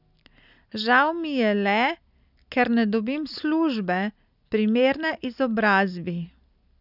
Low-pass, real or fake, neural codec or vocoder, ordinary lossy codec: 5.4 kHz; real; none; none